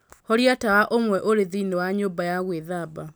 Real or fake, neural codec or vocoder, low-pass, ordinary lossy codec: real; none; none; none